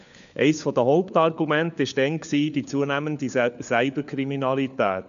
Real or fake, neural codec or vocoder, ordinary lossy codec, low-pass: fake; codec, 16 kHz, 4 kbps, FunCodec, trained on LibriTTS, 50 frames a second; none; 7.2 kHz